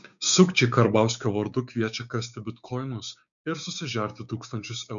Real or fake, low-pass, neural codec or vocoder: fake; 7.2 kHz; codec, 16 kHz, 6 kbps, DAC